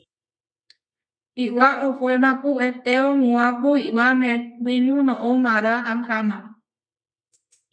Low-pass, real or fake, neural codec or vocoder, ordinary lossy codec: 9.9 kHz; fake; codec, 24 kHz, 0.9 kbps, WavTokenizer, medium music audio release; MP3, 64 kbps